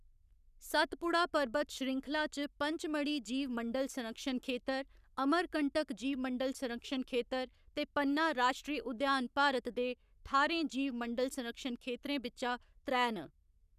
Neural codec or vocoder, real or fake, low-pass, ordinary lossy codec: codec, 44.1 kHz, 7.8 kbps, Pupu-Codec; fake; 14.4 kHz; none